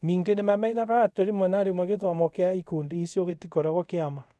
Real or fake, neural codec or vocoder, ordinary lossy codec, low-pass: fake; codec, 24 kHz, 0.5 kbps, DualCodec; none; none